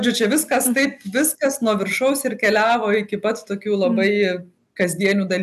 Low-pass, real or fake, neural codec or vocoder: 14.4 kHz; real; none